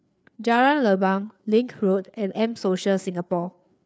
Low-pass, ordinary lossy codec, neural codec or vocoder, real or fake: none; none; codec, 16 kHz, 4 kbps, FreqCodec, larger model; fake